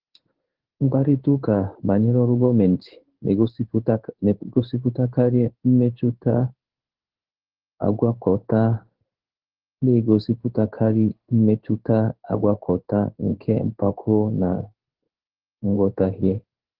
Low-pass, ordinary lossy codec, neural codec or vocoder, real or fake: 5.4 kHz; Opus, 16 kbps; codec, 16 kHz in and 24 kHz out, 1 kbps, XY-Tokenizer; fake